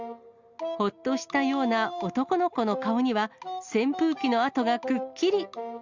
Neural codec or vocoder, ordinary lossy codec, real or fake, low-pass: none; Opus, 64 kbps; real; 7.2 kHz